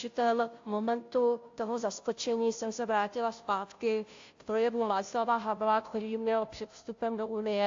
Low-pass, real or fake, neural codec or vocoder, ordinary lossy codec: 7.2 kHz; fake; codec, 16 kHz, 0.5 kbps, FunCodec, trained on Chinese and English, 25 frames a second; AAC, 48 kbps